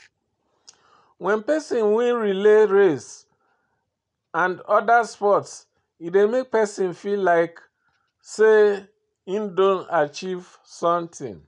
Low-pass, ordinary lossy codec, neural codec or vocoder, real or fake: 10.8 kHz; none; none; real